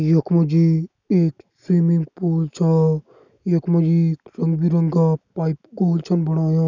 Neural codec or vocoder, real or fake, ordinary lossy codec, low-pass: none; real; none; 7.2 kHz